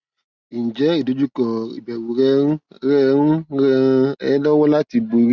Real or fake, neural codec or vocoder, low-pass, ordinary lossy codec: real; none; 7.2 kHz; Opus, 64 kbps